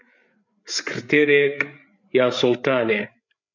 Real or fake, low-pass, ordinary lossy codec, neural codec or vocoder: fake; 7.2 kHz; MP3, 64 kbps; codec, 16 kHz, 8 kbps, FreqCodec, larger model